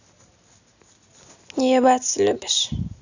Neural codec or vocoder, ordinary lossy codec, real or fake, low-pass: none; none; real; 7.2 kHz